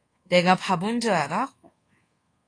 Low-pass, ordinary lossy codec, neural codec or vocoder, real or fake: 9.9 kHz; AAC, 32 kbps; codec, 24 kHz, 1.2 kbps, DualCodec; fake